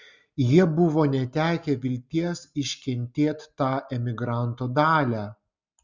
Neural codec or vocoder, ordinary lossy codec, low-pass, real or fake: none; Opus, 64 kbps; 7.2 kHz; real